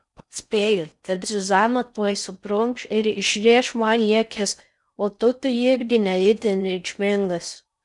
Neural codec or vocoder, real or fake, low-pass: codec, 16 kHz in and 24 kHz out, 0.6 kbps, FocalCodec, streaming, 4096 codes; fake; 10.8 kHz